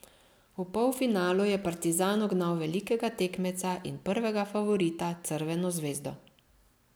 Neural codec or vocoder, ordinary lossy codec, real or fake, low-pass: none; none; real; none